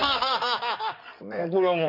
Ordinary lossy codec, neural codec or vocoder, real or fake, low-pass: none; codec, 16 kHz in and 24 kHz out, 2.2 kbps, FireRedTTS-2 codec; fake; 5.4 kHz